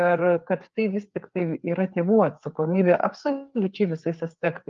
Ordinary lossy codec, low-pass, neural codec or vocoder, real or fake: Opus, 32 kbps; 7.2 kHz; codec, 16 kHz, 4 kbps, FreqCodec, larger model; fake